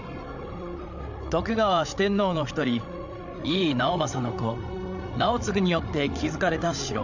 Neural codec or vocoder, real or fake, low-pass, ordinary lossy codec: codec, 16 kHz, 8 kbps, FreqCodec, larger model; fake; 7.2 kHz; none